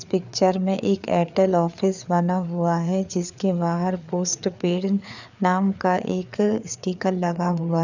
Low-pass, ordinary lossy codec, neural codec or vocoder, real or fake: 7.2 kHz; none; codec, 16 kHz, 4 kbps, FreqCodec, larger model; fake